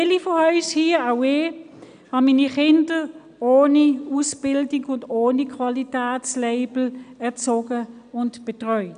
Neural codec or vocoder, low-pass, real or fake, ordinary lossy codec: none; 9.9 kHz; real; none